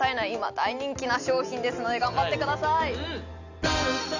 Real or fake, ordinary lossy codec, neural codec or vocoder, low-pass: real; none; none; 7.2 kHz